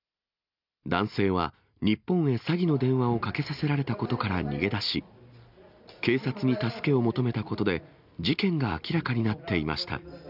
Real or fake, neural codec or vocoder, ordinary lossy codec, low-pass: real; none; none; 5.4 kHz